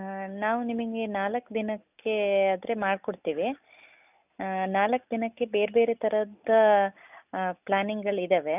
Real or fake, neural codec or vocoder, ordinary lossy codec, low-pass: real; none; none; 3.6 kHz